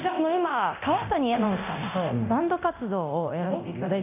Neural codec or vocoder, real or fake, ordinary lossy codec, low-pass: codec, 24 kHz, 0.9 kbps, DualCodec; fake; none; 3.6 kHz